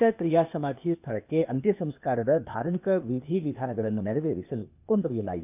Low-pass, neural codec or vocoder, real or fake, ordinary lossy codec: 3.6 kHz; codec, 16 kHz, 0.8 kbps, ZipCodec; fake; none